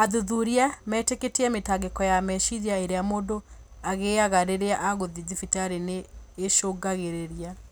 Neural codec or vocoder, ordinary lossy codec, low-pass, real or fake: none; none; none; real